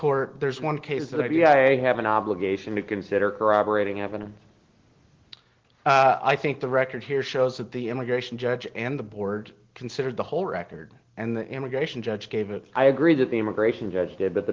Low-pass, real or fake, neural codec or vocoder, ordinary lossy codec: 7.2 kHz; real; none; Opus, 16 kbps